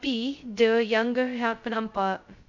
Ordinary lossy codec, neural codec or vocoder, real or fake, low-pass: MP3, 64 kbps; codec, 16 kHz, 0.2 kbps, FocalCodec; fake; 7.2 kHz